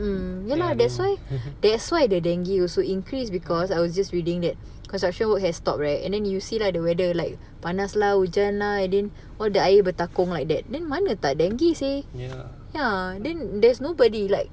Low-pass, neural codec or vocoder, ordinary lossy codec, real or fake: none; none; none; real